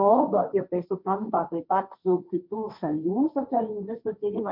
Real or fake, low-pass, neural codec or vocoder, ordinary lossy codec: fake; 5.4 kHz; codec, 16 kHz, 1.1 kbps, Voila-Tokenizer; MP3, 48 kbps